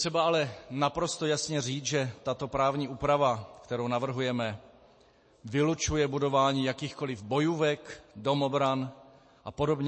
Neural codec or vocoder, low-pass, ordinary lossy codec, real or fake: none; 9.9 kHz; MP3, 32 kbps; real